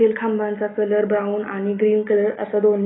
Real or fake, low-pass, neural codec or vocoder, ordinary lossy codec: real; 7.2 kHz; none; AAC, 16 kbps